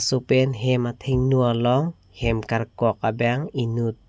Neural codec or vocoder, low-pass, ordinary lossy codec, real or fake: none; none; none; real